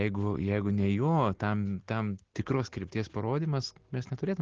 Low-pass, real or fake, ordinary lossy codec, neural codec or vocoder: 7.2 kHz; real; Opus, 16 kbps; none